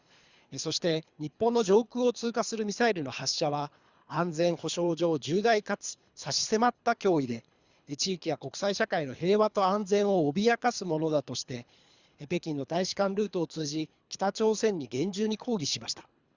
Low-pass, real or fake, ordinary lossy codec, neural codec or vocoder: 7.2 kHz; fake; Opus, 64 kbps; codec, 24 kHz, 3 kbps, HILCodec